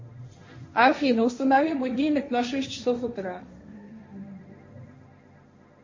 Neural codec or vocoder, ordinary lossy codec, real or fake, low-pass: codec, 16 kHz, 1.1 kbps, Voila-Tokenizer; MP3, 32 kbps; fake; 7.2 kHz